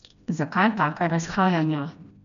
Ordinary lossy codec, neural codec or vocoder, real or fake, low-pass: none; codec, 16 kHz, 1 kbps, FreqCodec, smaller model; fake; 7.2 kHz